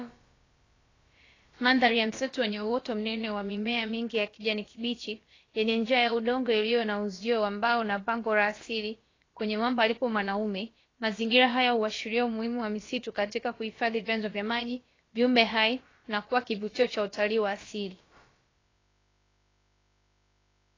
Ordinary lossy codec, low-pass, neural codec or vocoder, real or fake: AAC, 32 kbps; 7.2 kHz; codec, 16 kHz, about 1 kbps, DyCAST, with the encoder's durations; fake